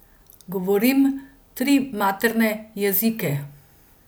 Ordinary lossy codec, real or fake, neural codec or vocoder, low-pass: none; real; none; none